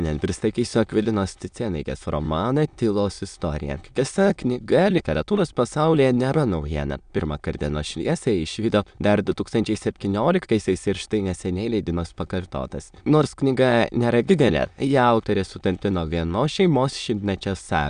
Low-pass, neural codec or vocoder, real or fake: 9.9 kHz; autoencoder, 22.05 kHz, a latent of 192 numbers a frame, VITS, trained on many speakers; fake